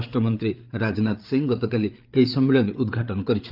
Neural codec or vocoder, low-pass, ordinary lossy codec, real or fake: codec, 16 kHz, 8 kbps, FreqCodec, larger model; 5.4 kHz; Opus, 32 kbps; fake